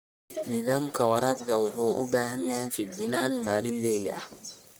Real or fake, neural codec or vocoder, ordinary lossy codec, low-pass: fake; codec, 44.1 kHz, 1.7 kbps, Pupu-Codec; none; none